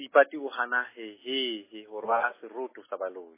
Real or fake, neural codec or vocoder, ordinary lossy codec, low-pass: real; none; MP3, 16 kbps; 3.6 kHz